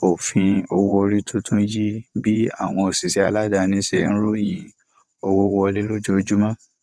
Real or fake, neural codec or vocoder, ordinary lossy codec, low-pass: fake; vocoder, 22.05 kHz, 80 mel bands, WaveNeXt; none; none